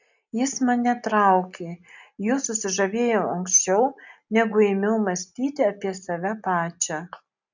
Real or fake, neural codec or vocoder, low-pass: real; none; 7.2 kHz